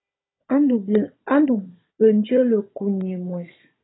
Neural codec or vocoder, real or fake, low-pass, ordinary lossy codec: codec, 16 kHz, 16 kbps, FunCodec, trained on Chinese and English, 50 frames a second; fake; 7.2 kHz; AAC, 16 kbps